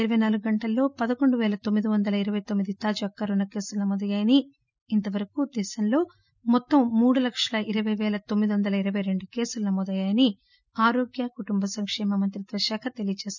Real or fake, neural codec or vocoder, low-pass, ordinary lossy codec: real; none; 7.2 kHz; none